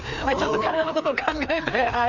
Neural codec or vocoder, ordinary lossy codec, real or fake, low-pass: codec, 16 kHz, 2 kbps, FreqCodec, larger model; none; fake; 7.2 kHz